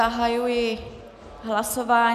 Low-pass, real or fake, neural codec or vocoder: 14.4 kHz; real; none